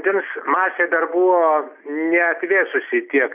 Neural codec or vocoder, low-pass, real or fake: none; 3.6 kHz; real